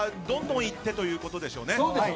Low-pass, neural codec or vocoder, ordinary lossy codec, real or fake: none; none; none; real